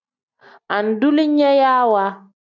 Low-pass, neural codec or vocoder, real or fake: 7.2 kHz; none; real